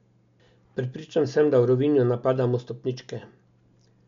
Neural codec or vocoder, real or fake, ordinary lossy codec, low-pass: none; real; MP3, 64 kbps; 7.2 kHz